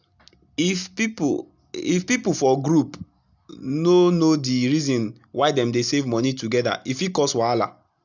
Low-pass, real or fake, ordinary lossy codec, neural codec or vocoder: 7.2 kHz; real; none; none